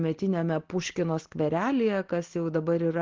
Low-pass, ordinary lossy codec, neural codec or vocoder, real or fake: 7.2 kHz; Opus, 16 kbps; none; real